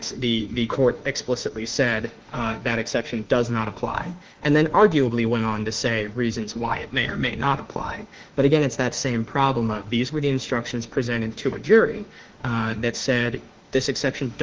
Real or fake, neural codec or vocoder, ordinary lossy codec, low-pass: fake; autoencoder, 48 kHz, 32 numbers a frame, DAC-VAE, trained on Japanese speech; Opus, 16 kbps; 7.2 kHz